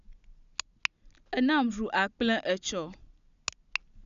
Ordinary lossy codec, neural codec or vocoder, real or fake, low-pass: none; none; real; 7.2 kHz